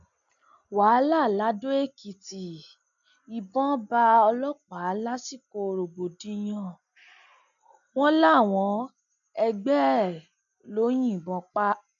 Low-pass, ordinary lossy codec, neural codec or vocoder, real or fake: 7.2 kHz; none; none; real